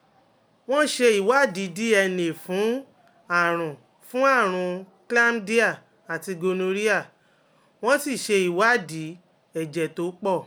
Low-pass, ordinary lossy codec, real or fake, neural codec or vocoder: none; none; real; none